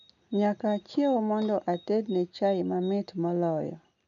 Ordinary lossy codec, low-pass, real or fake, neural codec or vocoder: none; 7.2 kHz; real; none